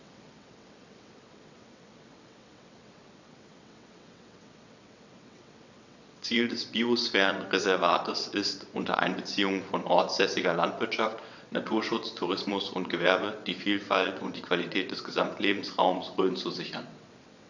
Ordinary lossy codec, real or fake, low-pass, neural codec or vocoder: none; fake; 7.2 kHz; vocoder, 22.05 kHz, 80 mel bands, WaveNeXt